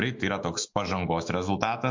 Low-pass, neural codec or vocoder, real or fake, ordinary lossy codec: 7.2 kHz; autoencoder, 48 kHz, 128 numbers a frame, DAC-VAE, trained on Japanese speech; fake; MP3, 48 kbps